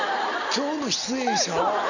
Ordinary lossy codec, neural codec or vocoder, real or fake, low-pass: none; none; real; 7.2 kHz